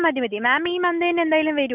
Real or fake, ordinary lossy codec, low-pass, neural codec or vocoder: real; none; 3.6 kHz; none